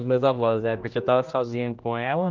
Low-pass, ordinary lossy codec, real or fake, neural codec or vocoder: 7.2 kHz; Opus, 24 kbps; fake; codec, 16 kHz, 1 kbps, X-Codec, HuBERT features, trained on general audio